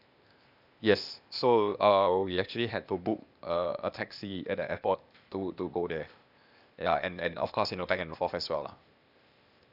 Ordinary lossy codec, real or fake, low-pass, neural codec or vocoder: none; fake; 5.4 kHz; codec, 16 kHz, 0.8 kbps, ZipCodec